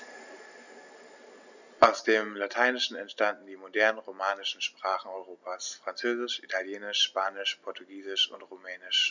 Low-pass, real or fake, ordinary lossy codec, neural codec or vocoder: 7.2 kHz; real; none; none